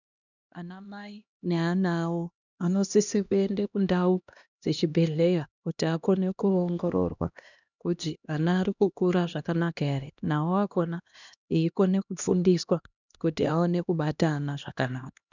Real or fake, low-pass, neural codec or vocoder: fake; 7.2 kHz; codec, 16 kHz, 1 kbps, X-Codec, HuBERT features, trained on LibriSpeech